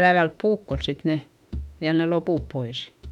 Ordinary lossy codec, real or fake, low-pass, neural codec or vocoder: none; fake; 19.8 kHz; autoencoder, 48 kHz, 32 numbers a frame, DAC-VAE, trained on Japanese speech